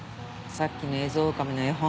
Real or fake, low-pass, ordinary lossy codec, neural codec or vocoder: real; none; none; none